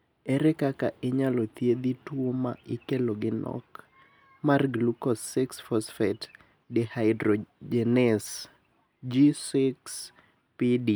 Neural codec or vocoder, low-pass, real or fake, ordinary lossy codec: none; none; real; none